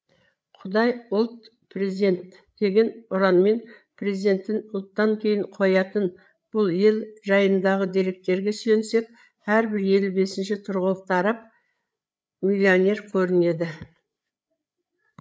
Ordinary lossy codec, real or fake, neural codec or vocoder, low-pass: none; fake; codec, 16 kHz, 8 kbps, FreqCodec, larger model; none